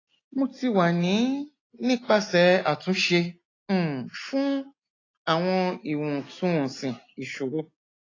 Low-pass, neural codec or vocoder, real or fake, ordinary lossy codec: 7.2 kHz; none; real; AAC, 32 kbps